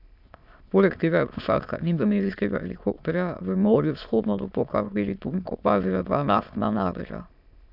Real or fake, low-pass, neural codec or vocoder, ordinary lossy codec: fake; 5.4 kHz; autoencoder, 22.05 kHz, a latent of 192 numbers a frame, VITS, trained on many speakers; none